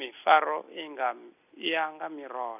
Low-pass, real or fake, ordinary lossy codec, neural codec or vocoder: 3.6 kHz; real; none; none